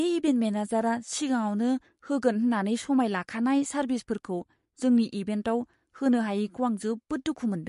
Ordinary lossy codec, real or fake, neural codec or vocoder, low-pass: MP3, 48 kbps; fake; codec, 44.1 kHz, 7.8 kbps, Pupu-Codec; 14.4 kHz